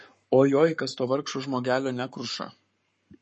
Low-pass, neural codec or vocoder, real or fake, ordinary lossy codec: 10.8 kHz; codec, 44.1 kHz, 7.8 kbps, Pupu-Codec; fake; MP3, 32 kbps